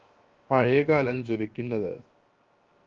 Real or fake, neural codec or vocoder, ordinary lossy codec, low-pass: fake; codec, 16 kHz, 0.7 kbps, FocalCodec; Opus, 32 kbps; 7.2 kHz